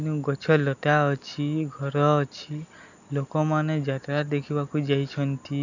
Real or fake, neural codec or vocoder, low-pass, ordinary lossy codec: real; none; 7.2 kHz; none